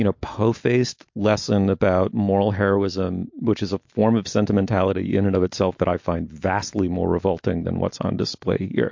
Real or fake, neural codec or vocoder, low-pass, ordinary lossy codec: real; none; 7.2 kHz; MP3, 48 kbps